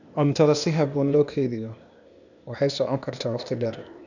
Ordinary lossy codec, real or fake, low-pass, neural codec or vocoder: none; fake; 7.2 kHz; codec, 16 kHz, 0.8 kbps, ZipCodec